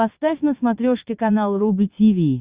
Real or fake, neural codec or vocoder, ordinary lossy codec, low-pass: fake; vocoder, 22.05 kHz, 80 mel bands, Vocos; Opus, 64 kbps; 3.6 kHz